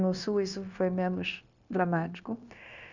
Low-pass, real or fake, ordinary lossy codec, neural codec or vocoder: 7.2 kHz; fake; none; codec, 16 kHz, 0.9 kbps, LongCat-Audio-Codec